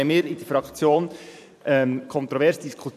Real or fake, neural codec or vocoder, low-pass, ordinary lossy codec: fake; vocoder, 44.1 kHz, 128 mel bands every 512 samples, BigVGAN v2; 14.4 kHz; none